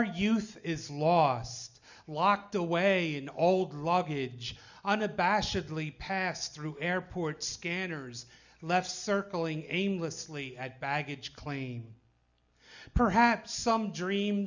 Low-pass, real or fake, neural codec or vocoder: 7.2 kHz; real; none